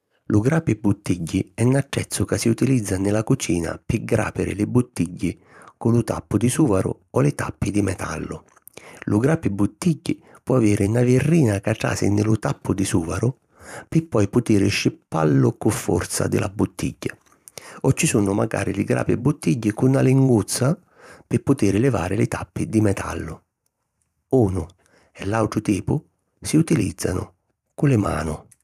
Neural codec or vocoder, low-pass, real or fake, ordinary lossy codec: vocoder, 44.1 kHz, 128 mel bands every 512 samples, BigVGAN v2; 14.4 kHz; fake; none